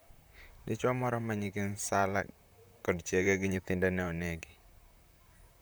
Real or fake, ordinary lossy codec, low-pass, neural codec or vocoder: fake; none; none; vocoder, 44.1 kHz, 128 mel bands, Pupu-Vocoder